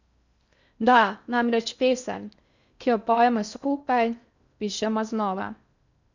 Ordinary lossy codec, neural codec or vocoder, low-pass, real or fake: none; codec, 16 kHz in and 24 kHz out, 0.6 kbps, FocalCodec, streaming, 4096 codes; 7.2 kHz; fake